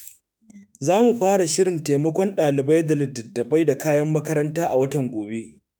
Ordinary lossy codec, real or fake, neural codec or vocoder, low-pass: none; fake; autoencoder, 48 kHz, 32 numbers a frame, DAC-VAE, trained on Japanese speech; none